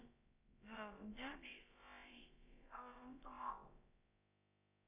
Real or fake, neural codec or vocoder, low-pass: fake; codec, 16 kHz, about 1 kbps, DyCAST, with the encoder's durations; 3.6 kHz